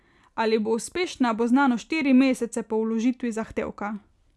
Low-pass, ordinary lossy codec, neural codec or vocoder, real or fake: none; none; none; real